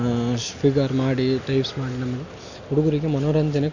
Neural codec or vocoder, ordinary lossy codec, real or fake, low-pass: none; none; real; 7.2 kHz